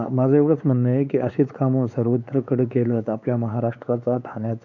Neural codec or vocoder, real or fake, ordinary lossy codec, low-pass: codec, 16 kHz, 4 kbps, X-Codec, WavLM features, trained on Multilingual LibriSpeech; fake; none; 7.2 kHz